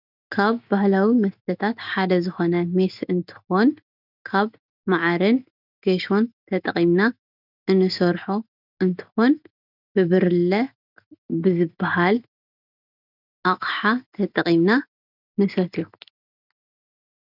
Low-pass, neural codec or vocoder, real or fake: 5.4 kHz; none; real